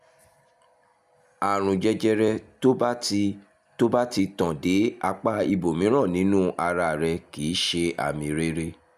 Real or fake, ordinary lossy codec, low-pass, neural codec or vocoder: real; none; 14.4 kHz; none